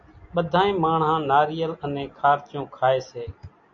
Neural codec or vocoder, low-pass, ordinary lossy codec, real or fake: none; 7.2 kHz; MP3, 64 kbps; real